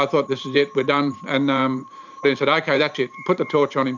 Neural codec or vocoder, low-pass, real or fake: vocoder, 44.1 kHz, 128 mel bands every 512 samples, BigVGAN v2; 7.2 kHz; fake